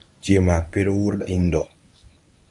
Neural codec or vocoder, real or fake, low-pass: codec, 24 kHz, 0.9 kbps, WavTokenizer, medium speech release version 1; fake; 10.8 kHz